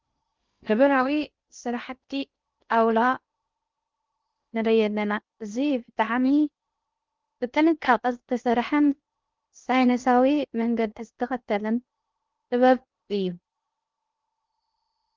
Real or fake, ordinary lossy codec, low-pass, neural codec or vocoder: fake; Opus, 24 kbps; 7.2 kHz; codec, 16 kHz in and 24 kHz out, 0.6 kbps, FocalCodec, streaming, 2048 codes